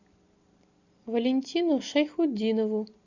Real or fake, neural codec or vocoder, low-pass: real; none; 7.2 kHz